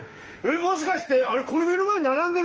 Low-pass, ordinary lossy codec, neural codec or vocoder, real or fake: 7.2 kHz; Opus, 24 kbps; autoencoder, 48 kHz, 32 numbers a frame, DAC-VAE, trained on Japanese speech; fake